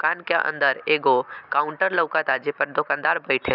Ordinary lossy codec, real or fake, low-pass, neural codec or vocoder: none; real; 5.4 kHz; none